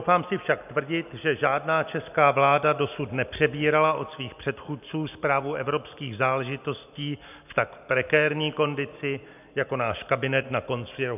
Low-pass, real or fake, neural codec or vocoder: 3.6 kHz; real; none